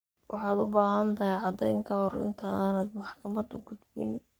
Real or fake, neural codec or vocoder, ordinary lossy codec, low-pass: fake; codec, 44.1 kHz, 3.4 kbps, Pupu-Codec; none; none